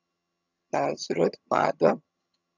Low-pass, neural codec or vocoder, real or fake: 7.2 kHz; vocoder, 22.05 kHz, 80 mel bands, HiFi-GAN; fake